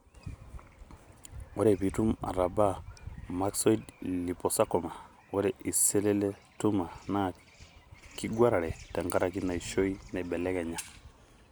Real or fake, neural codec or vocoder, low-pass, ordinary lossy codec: real; none; none; none